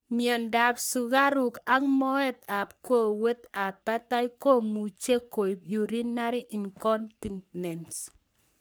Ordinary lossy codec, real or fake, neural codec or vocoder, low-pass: none; fake; codec, 44.1 kHz, 3.4 kbps, Pupu-Codec; none